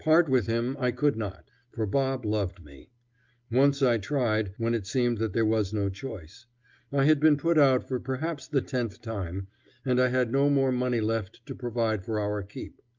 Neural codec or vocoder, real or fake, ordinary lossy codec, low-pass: none; real; Opus, 32 kbps; 7.2 kHz